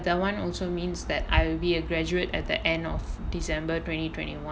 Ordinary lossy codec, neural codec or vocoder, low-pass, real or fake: none; none; none; real